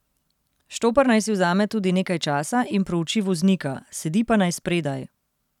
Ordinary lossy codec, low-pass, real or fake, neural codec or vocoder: none; 19.8 kHz; real; none